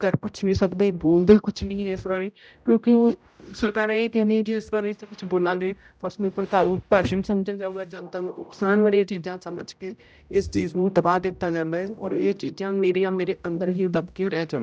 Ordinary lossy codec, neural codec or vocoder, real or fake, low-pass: none; codec, 16 kHz, 0.5 kbps, X-Codec, HuBERT features, trained on general audio; fake; none